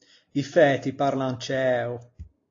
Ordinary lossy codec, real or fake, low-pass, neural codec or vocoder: AAC, 32 kbps; real; 7.2 kHz; none